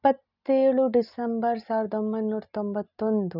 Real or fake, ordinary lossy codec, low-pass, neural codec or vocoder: real; none; 5.4 kHz; none